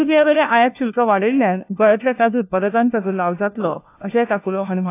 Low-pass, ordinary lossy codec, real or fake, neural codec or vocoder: 3.6 kHz; AAC, 24 kbps; fake; codec, 16 kHz, 1 kbps, FunCodec, trained on LibriTTS, 50 frames a second